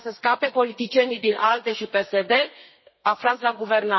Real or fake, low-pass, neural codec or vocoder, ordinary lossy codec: fake; 7.2 kHz; codec, 32 kHz, 1.9 kbps, SNAC; MP3, 24 kbps